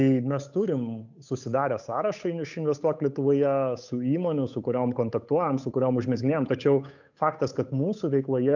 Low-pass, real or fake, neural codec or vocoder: 7.2 kHz; fake; codec, 16 kHz, 8 kbps, FunCodec, trained on Chinese and English, 25 frames a second